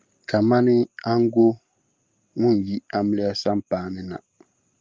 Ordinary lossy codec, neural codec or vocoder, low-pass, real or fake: Opus, 24 kbps; none; 7.2 kHz; real